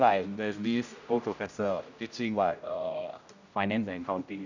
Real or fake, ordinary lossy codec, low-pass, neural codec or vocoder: fake; none; 7.2 kHz; codec, 16 kHz, 0.5 kbps, X-Codec, HuBERT features, trained on general audio